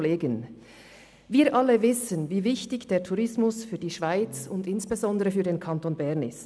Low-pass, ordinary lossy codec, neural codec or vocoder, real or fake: none; none; none; real